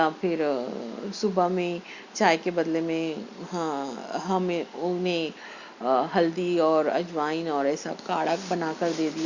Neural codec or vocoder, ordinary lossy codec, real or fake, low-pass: none; Opus, 64 kbps; real; 7.2 kHz